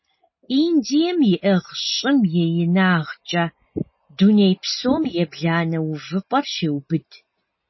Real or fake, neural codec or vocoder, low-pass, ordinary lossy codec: real; none; 7.2 kHz; MP3, 24 kbps